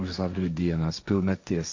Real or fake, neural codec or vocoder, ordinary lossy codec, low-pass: fake; codec, 16 kHz, 1.1 kbps, Voila-Tokenizer; MP3, 64 kbps; 7.2 kHz